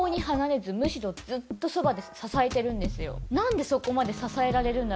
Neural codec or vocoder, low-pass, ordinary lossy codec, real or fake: none; none; none; real